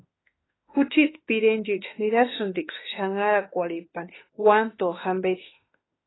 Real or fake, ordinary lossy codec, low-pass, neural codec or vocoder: fake; AAC, 16 kbps; 7.2 kHz; autoencoder, 48 kHz, 32 numbers a frame, DAC-VAE, trained on Japanese speech